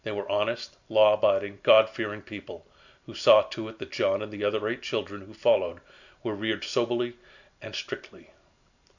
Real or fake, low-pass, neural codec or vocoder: real; 7.2 kHz; none